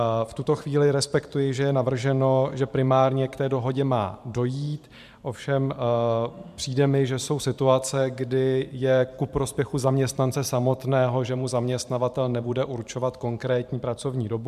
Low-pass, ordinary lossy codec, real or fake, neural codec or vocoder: 14.4 kHz; MP3, 96 kbps; fake; vocoder, 44.1 kHz, 128 mel bands every 512 samples, BigVGAN v2